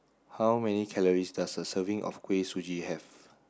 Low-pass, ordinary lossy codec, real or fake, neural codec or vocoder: none; none; real; none